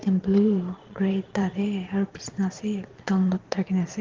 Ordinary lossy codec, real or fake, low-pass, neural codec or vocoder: Opus, 16 kbps; fake; 7.2 kHz; vocoder, 22.05 kHz, 80 mel bands, Vocos